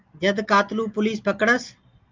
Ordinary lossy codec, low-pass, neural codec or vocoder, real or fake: Opus, 24 kbps; 7.2 kHz; none; real